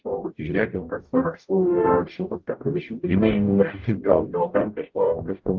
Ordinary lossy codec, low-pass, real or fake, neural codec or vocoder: Opus, 24 kbps; 7.2 kHz; fake; codec, 44.1 kHz, 0.9 kbps, DAC